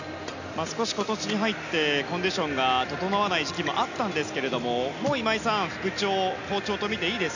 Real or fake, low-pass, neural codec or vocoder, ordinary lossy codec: real; 7.2 kHz; none; none